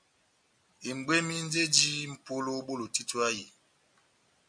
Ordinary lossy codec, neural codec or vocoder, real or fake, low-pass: MP3, 96 kbps; none; real; 9.9 kHz